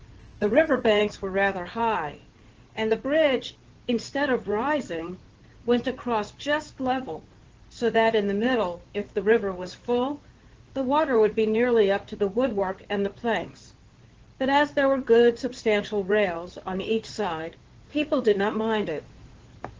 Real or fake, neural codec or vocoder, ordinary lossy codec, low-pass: fake; codec, 16 kHz in and 24 kHz out, 2.2 kbps, FireRedTTS-2 codec; Opus, 16 kbps; 7.2 kHz